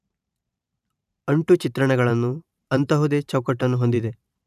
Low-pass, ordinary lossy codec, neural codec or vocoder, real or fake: 14.4 kHz; none; vocoder, 44.1 kHz, 128 mel bands every 512 samples, BigVGAN v2; fake